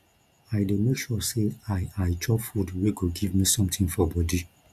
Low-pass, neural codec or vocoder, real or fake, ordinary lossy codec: 14.4 kHz; none; real; Opus, 64 kbps